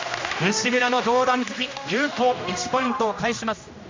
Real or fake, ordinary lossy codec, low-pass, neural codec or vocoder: fake; MP3, 64 kbps; 7.2 kHz; codec, 16 kHz, 1 kbps, X-Codec, HuBERT features, trained on general audio